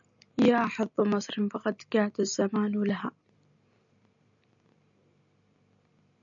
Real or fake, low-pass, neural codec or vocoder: real; 7.2 kHz; none